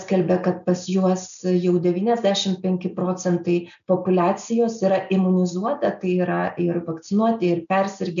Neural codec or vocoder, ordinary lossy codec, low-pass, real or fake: none; MP3, 96 kbps; 7.2 kHz; real